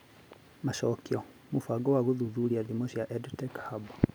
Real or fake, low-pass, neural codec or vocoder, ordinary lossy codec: real; none; none; none